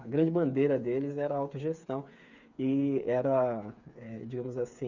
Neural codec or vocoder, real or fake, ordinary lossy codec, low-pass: codec, 16 kHz, 8 kbps, FreqCodec, smaller model; fake; none; 7.2 kHz